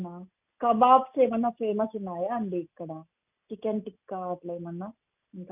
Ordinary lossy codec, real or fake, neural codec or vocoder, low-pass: none; real; none; 3.6 kHz